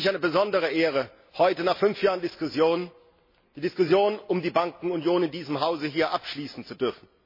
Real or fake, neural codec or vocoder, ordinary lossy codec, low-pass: real; none; MP3, 24 kbps; 5.4 kHz